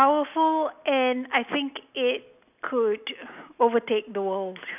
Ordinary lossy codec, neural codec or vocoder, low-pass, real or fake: none; none; 3.6 kHz; real